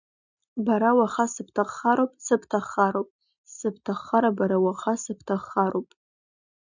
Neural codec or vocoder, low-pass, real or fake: none; 7.2 kHz; real